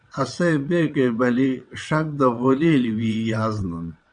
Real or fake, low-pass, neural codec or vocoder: fake; 9.9 kHz; vocoder, 22.05 kHz, 80 mel bands, WaveNeXt